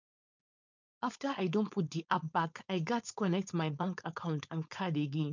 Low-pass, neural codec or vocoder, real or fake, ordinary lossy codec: 7.2 kHz; codec, 16 kHz, 4.8 kbps, FACodec; fake; none